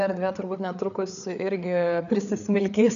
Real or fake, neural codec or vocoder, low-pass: fake; codec, 16 kHz, 4 kbps, FreqCodec, larger model; 7.2 kHz